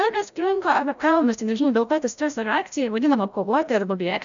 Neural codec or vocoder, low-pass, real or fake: codec, 16 kHz, 0.5 kbps, FreqCodec, larger model; 7.2 kHz; fake